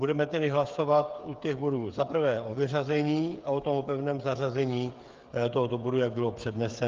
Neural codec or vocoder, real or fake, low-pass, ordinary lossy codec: codec, 16 kHz, 8 kbps, FreqCodec, smaller model; fake; 7.2 kHz; Opus, 32 kbps